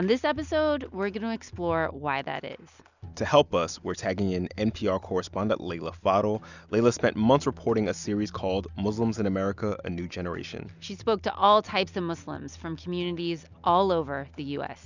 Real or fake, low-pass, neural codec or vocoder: real; 7.2 kHz; none